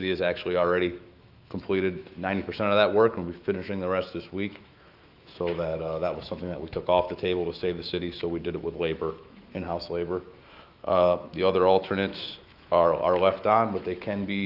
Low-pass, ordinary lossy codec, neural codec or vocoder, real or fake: 5.4 kHz; Opus, 24 kbps; autoencoder, 48 kHz, 128 numbers a frame, DAC-VAE, trained on Japanese speech; fake